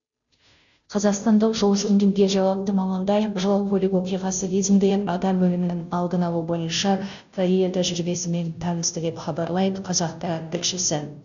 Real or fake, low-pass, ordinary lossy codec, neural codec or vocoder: fake; 7.2 kHz; none; codec, 16 kHz, 0.5 kbps, FunCodec, trained on Chinese and English, 25 frames a second